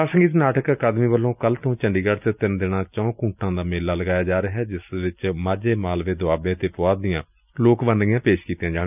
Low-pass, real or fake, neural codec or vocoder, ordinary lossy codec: 3.6 kHz; real; none; none